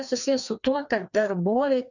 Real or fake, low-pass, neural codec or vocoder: fake; 7.2 kHz; codec, 16 kHz, 2 kbps, FreqCodec, larger model